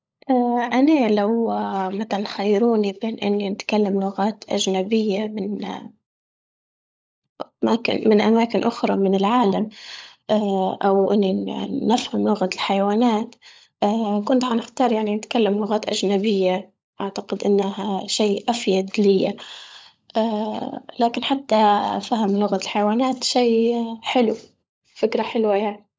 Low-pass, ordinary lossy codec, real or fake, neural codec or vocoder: none; none; fake; codec, 16 kHz, 16 kbps, FunCodec, trained on LibriTTS, 50 frames a second